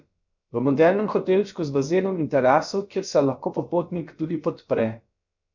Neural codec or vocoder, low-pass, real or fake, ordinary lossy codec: codec, 16 kHz, about 1 kbps, DyCAST, with the encoder's durations; 7.2 kHz; fake; none